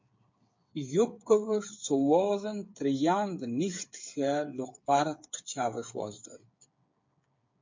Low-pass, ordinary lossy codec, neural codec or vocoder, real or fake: 7.2 kHz; MP3, 48 kbps; codec, 16 kHz, 8 kbps, FreqCodec, smaller model; fake